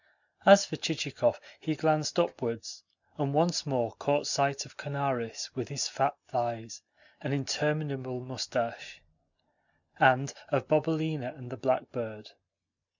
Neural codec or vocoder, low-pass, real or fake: none; 7.2 kHz; real